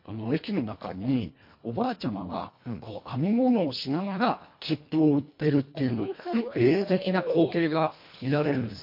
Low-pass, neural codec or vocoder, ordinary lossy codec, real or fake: 5.4 kHz; codec, 24 kHz, 1.5 kbps, HILCodec; MP3, 32 kbps; fake